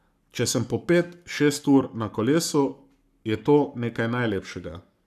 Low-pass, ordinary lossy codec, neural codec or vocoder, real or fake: 14.4 kHz; none; codec, 44.1 kHz, 7.8 kbps, Pupu-Codec; fake